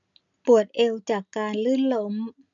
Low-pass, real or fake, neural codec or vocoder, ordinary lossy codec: 7.2 kHz; real; none; none